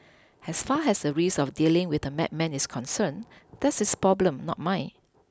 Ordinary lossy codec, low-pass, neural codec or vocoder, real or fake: none; none; none; real